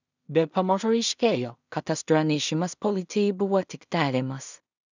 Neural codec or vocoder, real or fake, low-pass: codec, 16 kHz in and 24 kHz out, 0.4 kbps, LongCat-Audio-Codec, two codebook decoder; fake; 7.2 kHz